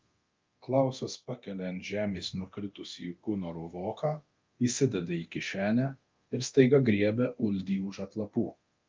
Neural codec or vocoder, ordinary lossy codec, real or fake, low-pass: codec, 24 kHz, 0.9 kbps, DualCodec; Opus, 24 kbps; fake; 7.2 kHz